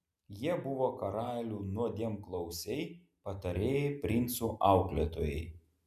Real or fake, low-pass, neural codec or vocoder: real; 14.4 kHz; none